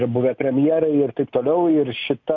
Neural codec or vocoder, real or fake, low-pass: none; real; 7.2 kHz